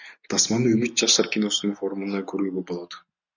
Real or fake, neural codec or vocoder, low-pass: real; none; 7.2 kHz